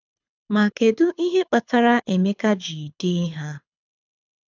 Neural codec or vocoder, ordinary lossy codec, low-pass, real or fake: codec, 24 kHz, 6 kbps, HILCodec; none; 7.2 kHz; fake